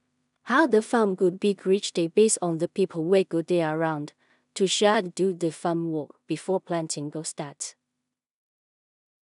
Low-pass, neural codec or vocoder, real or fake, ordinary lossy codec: 10.8 kHz; codec, 16 kHz in and 24 kHz out, 0.4 kbps, LongCat-Audio-Codec, two codebook decoder; fake; none